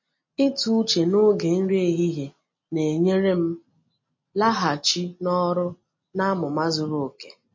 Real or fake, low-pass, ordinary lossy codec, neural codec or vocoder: real; 7.2 kHz; MP3, 32 kbps; none